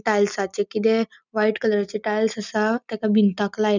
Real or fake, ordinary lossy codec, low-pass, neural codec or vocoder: real; none; 7.2 kHz; none